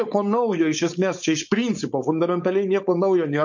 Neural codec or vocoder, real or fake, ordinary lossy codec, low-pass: codec, 16 kHz, 4.8 kbps, FACodec; fake; MP3, 48 kbps; 7.2 kHz